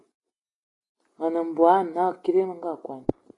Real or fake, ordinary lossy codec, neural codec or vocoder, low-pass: real; AAC, 32 kbps; none; 10.8 kHz